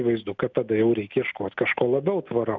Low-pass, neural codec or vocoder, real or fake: 7.2 kHz; none; real